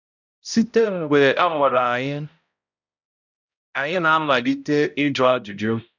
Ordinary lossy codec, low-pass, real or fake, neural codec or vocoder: none; 7.2 kHz; fake; codec, 16 kHz, 0.5 kbps, X-Codec, HuBERT features, trained on balanced general audio